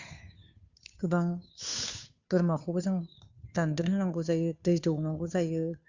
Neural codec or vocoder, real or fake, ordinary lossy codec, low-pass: codec, 16 kHz, 2 kbps, FunCodec, trained on Chinese and English, 25 frames a second; fake; Opus, 64 kbps; 7.2 kHz